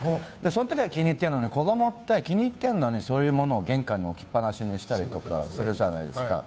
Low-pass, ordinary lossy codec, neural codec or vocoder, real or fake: none; none; codec, 16 kHz, 2 kbps, FunCodec, trained on Chinese and English, 25 frames a second; fake